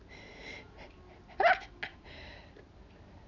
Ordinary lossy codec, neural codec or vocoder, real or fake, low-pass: none; none; real; 7.2 kHz